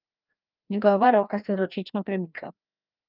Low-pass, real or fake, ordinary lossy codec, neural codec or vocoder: 5.4 kHz; fake; Opus, 24 kbps; codec, 16 kHz, 1 kbps, FreqCodec, larger model